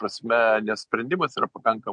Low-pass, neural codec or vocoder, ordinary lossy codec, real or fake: 9.9 kHz; none; MP3, 64 kbps; real